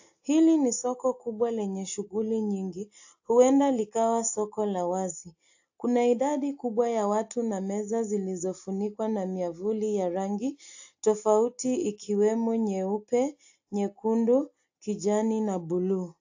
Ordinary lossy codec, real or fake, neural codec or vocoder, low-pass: AAC, 48 kbps; real; none; 7.2 kHz